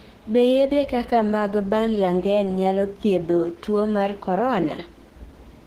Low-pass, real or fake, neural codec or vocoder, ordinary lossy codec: 14.4 kHz; fake; codec, 32 kHz, 1.9 kbps, SNAC; Opus, 16 kbps